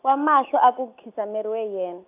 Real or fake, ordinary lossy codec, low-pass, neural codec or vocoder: real; AAC, 32 kbps; 3.6 kHz; none